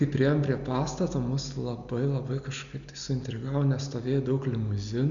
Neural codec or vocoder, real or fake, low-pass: none; real; 7.2 kHz